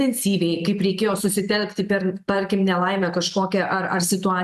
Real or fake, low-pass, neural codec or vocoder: real; 14.4 kHz; none